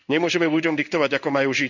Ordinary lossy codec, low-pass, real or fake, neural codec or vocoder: none; 7.2 kHz; fake; codec, 16 kHz in and 24 kHz out, 1 kbps, XY-Tokenizer